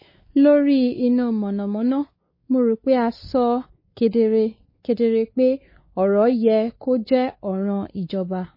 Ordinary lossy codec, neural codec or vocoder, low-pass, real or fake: MP3, 24 kbps; codec, 16 kHz, 4 kbps, X-Codec, WavLM features, trained on Multilingual LibriSpeech; 5.4 kHz; fake